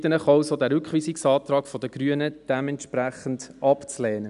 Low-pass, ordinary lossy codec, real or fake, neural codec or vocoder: 10.8 kHz; none; real; none